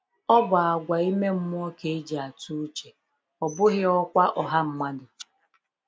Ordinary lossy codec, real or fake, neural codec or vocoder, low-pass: none; real; none; none